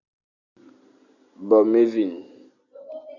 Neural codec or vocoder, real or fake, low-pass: none; real; 7.2 kHz